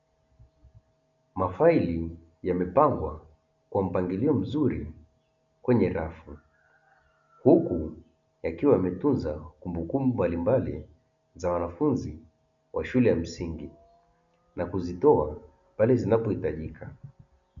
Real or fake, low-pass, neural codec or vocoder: real; 7.2 kHz; none